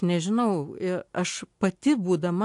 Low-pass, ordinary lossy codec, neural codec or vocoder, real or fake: 10.8 kHz; AAC, 64 kbps; none; real